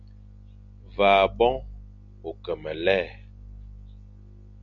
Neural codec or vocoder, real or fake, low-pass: none; real; 7.2 kHz